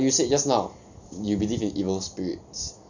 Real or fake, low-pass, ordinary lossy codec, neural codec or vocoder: real; 7.2 kHz; none; none